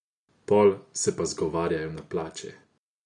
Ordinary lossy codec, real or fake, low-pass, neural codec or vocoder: none; real; 10.8 kHz; none